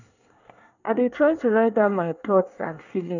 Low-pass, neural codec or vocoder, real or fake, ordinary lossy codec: 7.2 kHz; codec, 24 kHz, 1 kbps, SNAC; fake; none